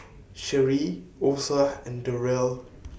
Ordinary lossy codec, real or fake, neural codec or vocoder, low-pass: none; real; none; none